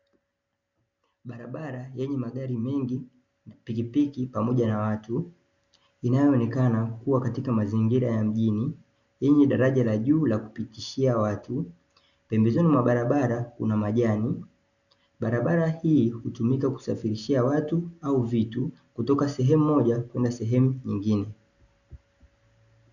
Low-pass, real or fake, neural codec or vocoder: 7.2 kHz; real; none